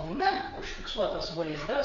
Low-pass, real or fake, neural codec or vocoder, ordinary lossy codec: 7.2 kHz; fake; codec, 16 kHz, 4 kbps, FreqCodec, larger model; Opus, 64 kbps